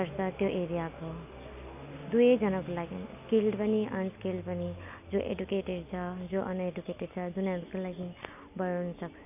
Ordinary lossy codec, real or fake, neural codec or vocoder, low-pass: none; real; none; 3.6 kHz